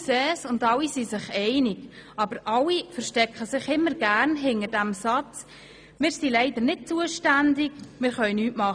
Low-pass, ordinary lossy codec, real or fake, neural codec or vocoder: 9.9 kHz; none; real; none